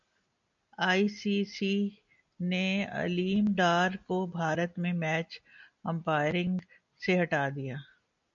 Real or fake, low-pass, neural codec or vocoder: real; 7.2 kHz; none